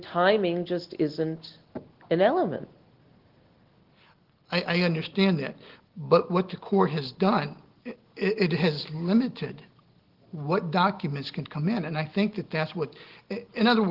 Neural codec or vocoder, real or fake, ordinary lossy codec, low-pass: none; real; Opus, 16 kbps; 5.4 kHz